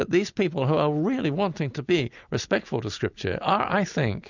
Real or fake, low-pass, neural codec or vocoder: real; 7.2 kHz; none